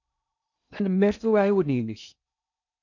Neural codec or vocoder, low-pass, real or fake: codec, 16 kHz in and 24 kHz out, 0.6 kbps, FocalCodec, streaming, 2048 codes; 7.2 kHz; fake